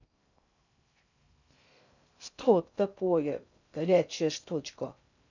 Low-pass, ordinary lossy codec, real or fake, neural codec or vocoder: 7.2 kHz; none; fake; codec, 16 kHz in and 24 kHz out, 0.6 kbps, FocalCodec, streaming, 2048 codes